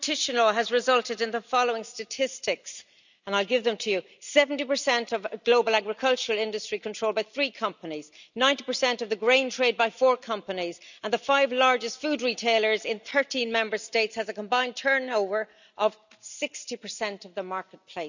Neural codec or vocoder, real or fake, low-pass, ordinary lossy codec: none; real; 7.2 kHz; none